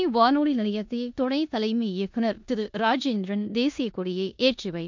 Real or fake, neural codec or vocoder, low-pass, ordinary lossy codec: fake; codec, 16 kHz in and 24 kHz out, 0.9 kbps, LongCat-Audio-Codec, four codebook decoder; 7.2 kHz; MP3, 64 kbps